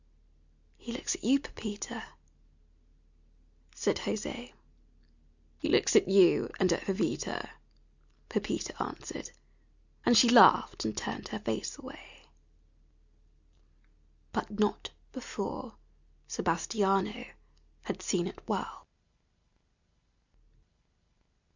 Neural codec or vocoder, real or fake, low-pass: none; real; 7.2 kHz